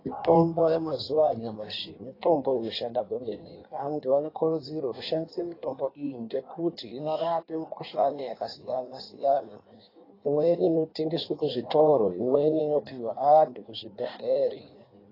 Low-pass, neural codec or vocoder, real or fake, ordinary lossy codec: 5.4 kHz; codec, 16 kHz in and 24 kHz out, 1.1 kbps, FireRedTTS-2 codec; fake; AAC, 24 kbps